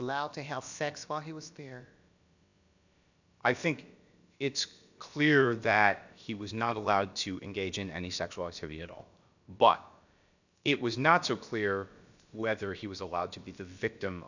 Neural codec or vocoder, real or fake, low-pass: codec, 16 kHz, about 1 kbps, DyCAST, with the encoder's durations; fake; 7.2 kHz